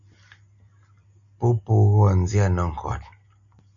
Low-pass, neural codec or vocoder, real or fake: 7.2 kHz; none; real